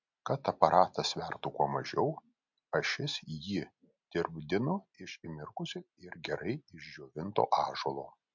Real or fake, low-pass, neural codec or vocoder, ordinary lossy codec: real; 7.2 kHz; none; MP3, 64 kbps